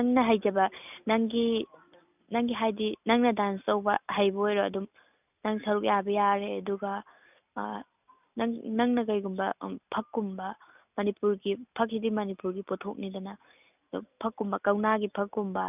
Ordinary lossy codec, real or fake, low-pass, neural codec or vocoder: none; real; 3.6 kHz; none